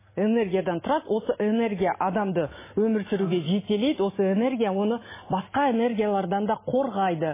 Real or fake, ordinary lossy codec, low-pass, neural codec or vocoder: real; MP3, 16 kbps; 3.6 kHz; none